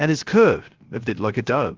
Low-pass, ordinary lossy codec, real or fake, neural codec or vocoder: 7.2 kHz; Opus, 16 kbps; fake; codec, 16 kHz, 0.3 kbps, FocalCodec